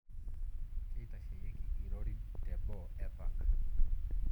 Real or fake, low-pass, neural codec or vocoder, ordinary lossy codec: fake; 19.8 kHz; vocoder, 44.1 kHz, 128 mel bands every 256 samples, BigVGAN v2; none